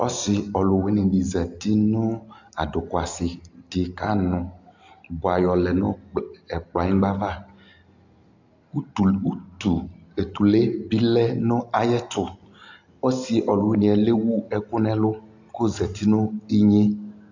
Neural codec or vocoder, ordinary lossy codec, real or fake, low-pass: none; AAC, 48 kbps; real; 7.2 kHz